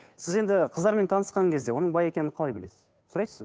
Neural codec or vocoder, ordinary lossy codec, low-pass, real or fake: codec, 16 kHz, 2 kbps, FunCodec, trained on Chinese and English, 25 frames a second; none; none; fake